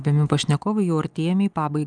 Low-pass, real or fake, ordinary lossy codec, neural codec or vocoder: 9.9 kHz; real; Opus, 32 kbps; none